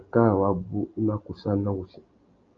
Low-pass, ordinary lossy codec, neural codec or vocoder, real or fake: 7.2 kHz; Opus, 24 kbps; none; real